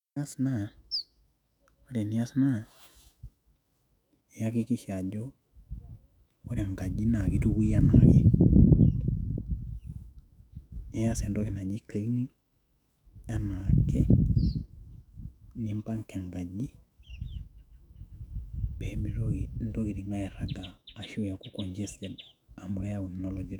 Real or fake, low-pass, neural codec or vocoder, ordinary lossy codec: fake; 19.8 kHz; autoencoder, 48 kHz, 128 numbers a frame, DAC-VAE, trained on Japanese speech; none